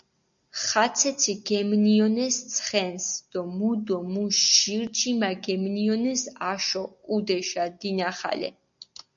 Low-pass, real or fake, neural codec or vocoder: 7.2 kHz; real; none